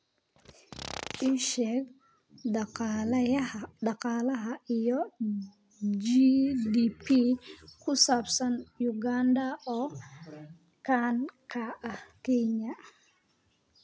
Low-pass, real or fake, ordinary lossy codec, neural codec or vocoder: none; real; none; none